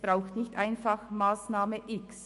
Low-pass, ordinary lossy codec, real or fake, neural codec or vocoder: 14.4 kHz; MP3, 48 kbps; fake; autoencoder, 48 kHz, 128 numbers a frame, DAC-VAE, trained on Japanese speech